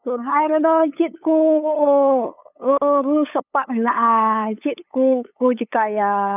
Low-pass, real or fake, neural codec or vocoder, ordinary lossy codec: 3.6 kHz; fake; codec, 16 kHz, 8 kbps, FunCodec, trained on LibriTTS, 25 frames a second; none